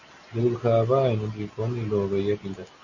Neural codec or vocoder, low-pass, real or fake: none; 7.2 kHz; real